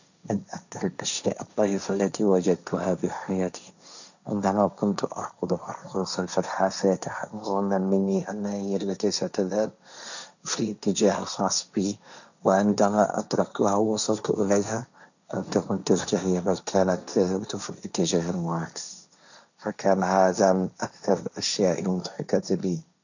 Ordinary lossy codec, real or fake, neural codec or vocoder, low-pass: none; fake; codec, 16 kHz, 1.1 kbps, Voila-Tokenizer; 7.2 kHz